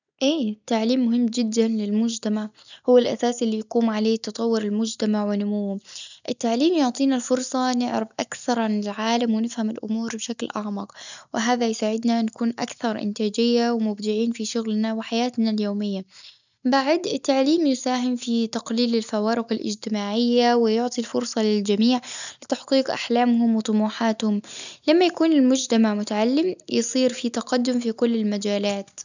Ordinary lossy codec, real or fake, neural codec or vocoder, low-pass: none; real; none; 7.2 kHz